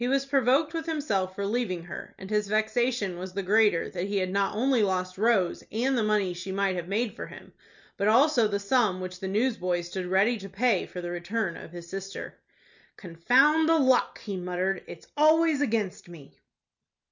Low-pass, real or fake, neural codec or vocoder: 7.2 kHz; real; none